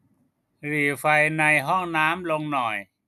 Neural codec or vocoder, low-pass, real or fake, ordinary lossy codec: none; 14.4 kHz; real; none